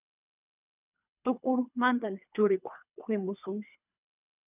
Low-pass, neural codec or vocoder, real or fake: 3.6 kHz; codec, 24 kHz, 3 kbps, HILCodec; fake